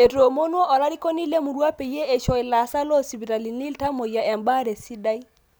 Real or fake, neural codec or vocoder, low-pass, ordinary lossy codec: real; none; none; none